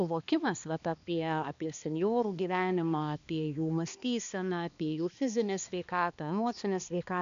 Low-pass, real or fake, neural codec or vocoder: 7.2 kHz; fake; codec, 16 kHz, 2 kbps, X-Codec, HuBERT features, trained on balanced general audio